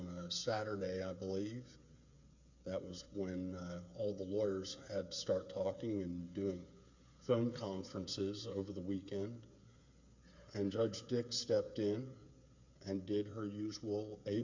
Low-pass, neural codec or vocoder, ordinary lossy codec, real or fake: 7.2 kHz; codec, 16 kHz, 8 kbps, FreqCodec, smaller model; MP3, 48 kbps; fake